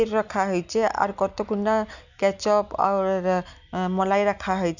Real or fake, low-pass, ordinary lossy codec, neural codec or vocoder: real; 7.2 kHz; none; none